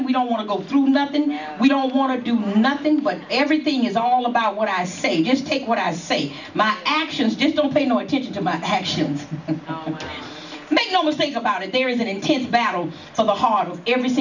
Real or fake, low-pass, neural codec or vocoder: real; 7.2 kHz; none